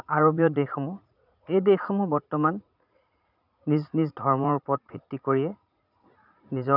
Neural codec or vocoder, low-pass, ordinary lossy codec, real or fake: vocoder, 44.1 kHz, 80 mel bands, Vocos; 5.4 kHz; none; fake